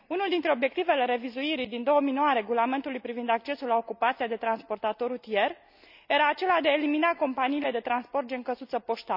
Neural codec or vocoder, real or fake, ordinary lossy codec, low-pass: none; real; none; 5.4 kHz